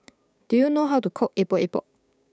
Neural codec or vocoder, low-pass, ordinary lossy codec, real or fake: codec, 16 kHz, 6 kbps, DAC; none; none; fake